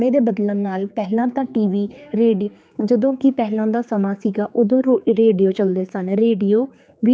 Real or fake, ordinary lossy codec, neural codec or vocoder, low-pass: fake; none; codec, 16 kHz, 4 kbps, X-Codec, HuBERT features, trained on general audio; none